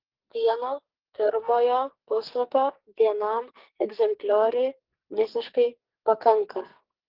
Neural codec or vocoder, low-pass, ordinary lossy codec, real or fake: codec, 44.1 kHz, 2.6 kbps, SNAC; 5.4 kHz; Opus, 16 kbps; fake